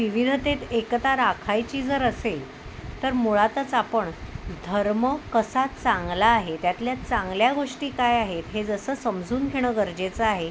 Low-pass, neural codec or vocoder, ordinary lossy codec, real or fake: none; none; none; real